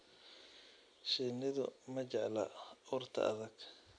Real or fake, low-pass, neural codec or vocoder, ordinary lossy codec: real; 9.9 kHz; none; none